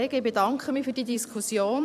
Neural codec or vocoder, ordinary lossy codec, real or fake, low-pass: vocoder, 44.1 kHz, 128 mel bands every 256 samples, BigVGAN v2; none; fake; 14.4 kHz